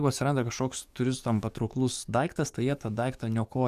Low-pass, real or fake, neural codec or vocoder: 14.4 kHz; fake; codec, 44.1 kHz, 7.8 kbps, DAC